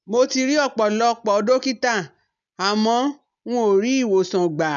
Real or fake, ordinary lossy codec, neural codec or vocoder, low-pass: real; none; none; 7.2 kHz